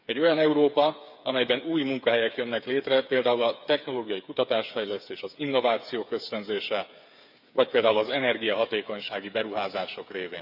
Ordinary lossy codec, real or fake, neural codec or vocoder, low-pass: none; fake; codec, 16 kHz, 8 kbps, FreqCodec, smaller model; 5.4 kHz